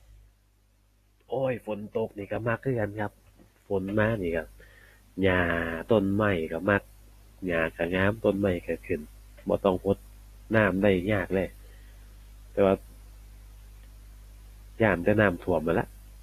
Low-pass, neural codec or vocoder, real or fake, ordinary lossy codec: 14.4 kHz; none; real; AAC, 48 kbps